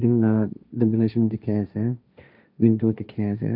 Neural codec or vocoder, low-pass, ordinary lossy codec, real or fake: codec, 16 kHz, 1.1 kbps, Voila-Tokenizer; 5.4 kHz; none; fake